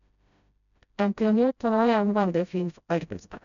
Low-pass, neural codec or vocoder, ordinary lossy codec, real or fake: 7.2 kHz; codec, 16 kHz, 0.5 kbps, FreqCodec, smaller model; none; fake